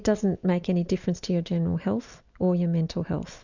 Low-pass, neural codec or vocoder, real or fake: 7.2 kHz; none; real